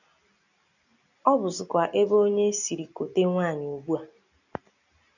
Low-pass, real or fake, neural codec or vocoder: 7.2 kHz; real; none